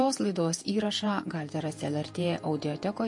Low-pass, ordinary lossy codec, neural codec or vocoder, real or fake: 10.8 kHz; MP3, 48 kbps; vocoder, 48 kHz, 128 mel bands, Vocos; fake